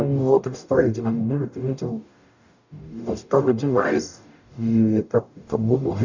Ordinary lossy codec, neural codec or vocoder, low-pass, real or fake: none; codec, 44.1 kHz, 0.9 kbps, DAC; 7.2 kHz; fake